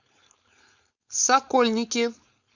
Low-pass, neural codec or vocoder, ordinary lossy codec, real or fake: 7.2 kHz; codec, 16 kHz, 4.8 kbps, FACodec; Opus, 64 kbps; fake